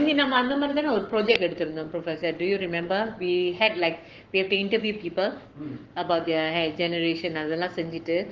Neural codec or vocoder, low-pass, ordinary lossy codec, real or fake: codec, 44.1 kHz, 7.8 kbps, Pupu-Codec; 7.2 kHz; Opus, 16 kbps; fake